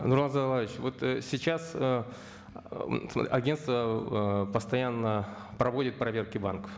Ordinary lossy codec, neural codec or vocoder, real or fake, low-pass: none; none; real; none